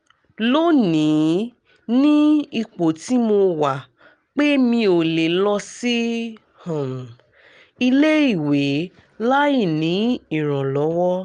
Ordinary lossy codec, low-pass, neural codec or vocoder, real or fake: Opus, 32 kbps; 9.9 kHz; none; real